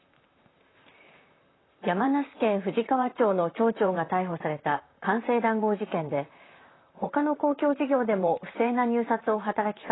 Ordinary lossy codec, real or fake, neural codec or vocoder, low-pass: AAC, 16 kbps; fake; vocoder, 44.1 kHz, 128 mel bands, Pupu-Vocoder; 7.2 kHz